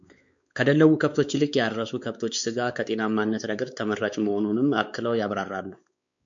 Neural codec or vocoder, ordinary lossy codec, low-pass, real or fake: codec, 16 kHz, 4 kbps, X-Codec, WavLM features, trained on Multilingual LibriSpeech; MP3, 64 kbps; 7.2 kHz; fake